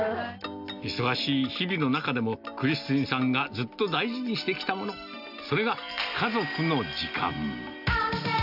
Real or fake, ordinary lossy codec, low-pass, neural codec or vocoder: real; none; 5.4 kHz; none